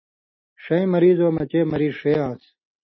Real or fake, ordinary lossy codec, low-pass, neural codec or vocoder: real; MP3, 24 kbps; 7.2 kHz; none